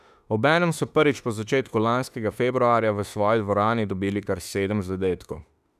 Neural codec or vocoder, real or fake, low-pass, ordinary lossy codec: autoencoder, 48 kHz, 32 numbers a frame, DAC-VAE, trained on Japanese speech; fake; 14.4 kHz; none